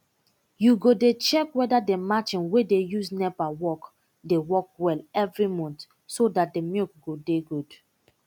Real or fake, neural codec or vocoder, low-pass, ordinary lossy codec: real; none; 19.8 kHz; none